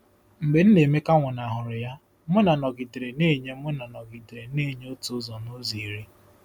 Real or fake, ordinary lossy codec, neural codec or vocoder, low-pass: real; none; none; 19.8 kHz